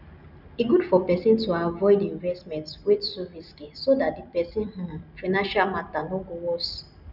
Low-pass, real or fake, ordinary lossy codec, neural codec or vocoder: 5.4 kHz; real; none; none